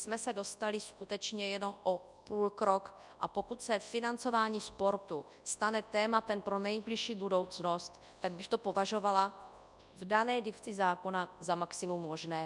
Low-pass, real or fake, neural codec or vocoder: 10.8 kHz; fake; codec, 24 kHz, 0.9 kbps, WavTokenizer, large speech release